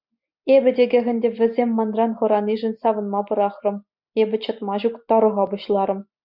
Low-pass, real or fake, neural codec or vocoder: 5.4 kHz; real; none